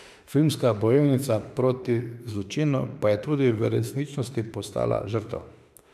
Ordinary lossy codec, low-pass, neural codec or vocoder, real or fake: none; 14.4 kHz; autoencoder, 48 kHz, 32 numbers a frame, DAC-VAE, trained on Japanese speech; fake